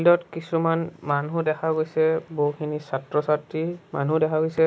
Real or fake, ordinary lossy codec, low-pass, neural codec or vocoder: real; none; none; none